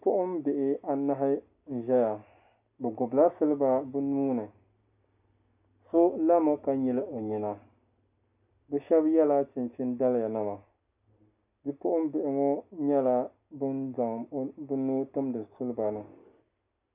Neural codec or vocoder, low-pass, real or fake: none; 3.6 kHz; real